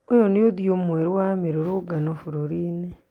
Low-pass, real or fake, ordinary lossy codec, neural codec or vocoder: 19.8 kHz; real; Opus, 32 kbps; none